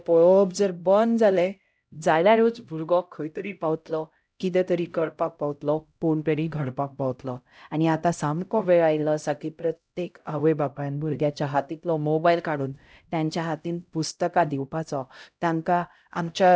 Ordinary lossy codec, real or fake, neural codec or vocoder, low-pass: none; fake; codec, 16 kHz, 0.5 kbps, X-Codec, HuBERT features, trained on LibriSpeech; none